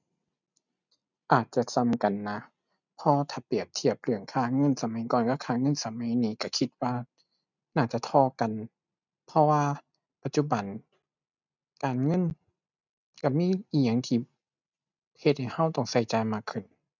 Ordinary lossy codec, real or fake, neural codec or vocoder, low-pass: none; real; none; 7.2 kHz